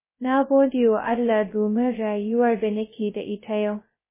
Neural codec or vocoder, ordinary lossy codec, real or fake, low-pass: codec, 16 kHz, 0.2 kbps, FocalCodec; MP3, 16 kbps; fake; 3.6 kHz